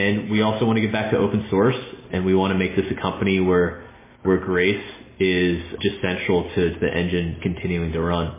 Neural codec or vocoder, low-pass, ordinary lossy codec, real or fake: none; 3.6 kHz; MP3, 16 kbps; real